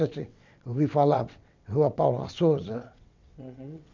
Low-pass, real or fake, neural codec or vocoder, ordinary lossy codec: 7.2 kHz; fake; vocoder, 22.05 kHz, 80 mel bands, WaveNeXt; MP3, 64 kbps